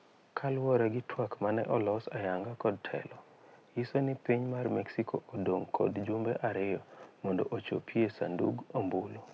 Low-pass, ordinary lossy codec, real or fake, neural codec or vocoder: none; none; real; none